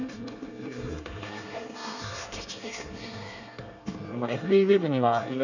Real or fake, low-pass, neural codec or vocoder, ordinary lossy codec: fake; 7.2 kHz; codec, 24 kHz, 1 kbps, SNAC; none